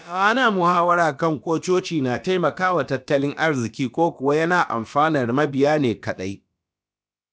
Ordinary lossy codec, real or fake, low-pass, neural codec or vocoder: none; fake; none; codec, 16 kHz, about 1 kbps, DyCAST, with the encoder's durations